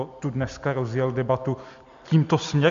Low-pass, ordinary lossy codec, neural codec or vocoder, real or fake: 7.2 kHz; MP3, 48 kbps; none; real